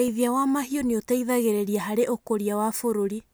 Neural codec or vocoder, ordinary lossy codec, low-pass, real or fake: none; none; none; real